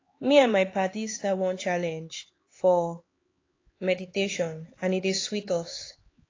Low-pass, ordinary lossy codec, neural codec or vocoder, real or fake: 7.2 kHz; AAC, 32 kbps; codec, 16 kHz, 4 kbps, X-Codec, HuBERT features, trained on LibriSpeech; fake